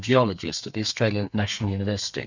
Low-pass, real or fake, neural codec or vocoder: 7.2 kHz; fake; codec, 32 kHz, 1.9 kbps, SNAC